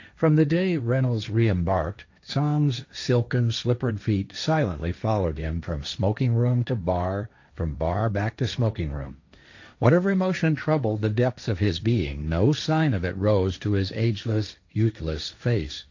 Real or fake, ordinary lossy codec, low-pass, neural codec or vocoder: fake; AAC, 48 kbps; 7.2 kHz; codec, 16 kHz, 1.1 kbps, Voila-Tokenizer